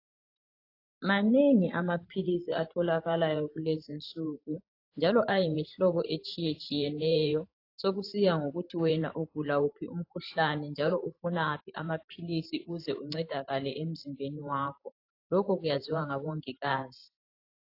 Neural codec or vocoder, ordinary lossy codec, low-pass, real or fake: vocoder, 44.1 kHz, 128 mel bands every 512 samples, BigVGAN v2; AAC, 32 kbps; 5.4 kHz; fake